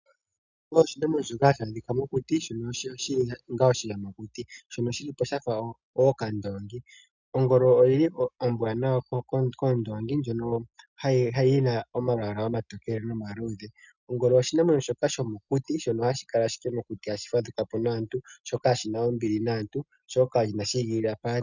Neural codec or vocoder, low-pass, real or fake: none; 7.2 kHz; real